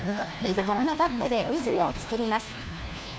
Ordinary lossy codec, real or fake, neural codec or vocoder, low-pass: none; fake; codec, 16 kHz, 1 kbps, FunCodec, trained on LibriTTS, 50 frames a second; none